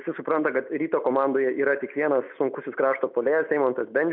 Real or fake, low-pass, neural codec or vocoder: real; 5.4 kHz; none